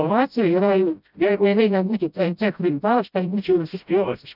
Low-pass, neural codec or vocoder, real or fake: 5.4 kHz; codec, 16 kHz, 0.5 kbps, FreqCodec, smaller model; fake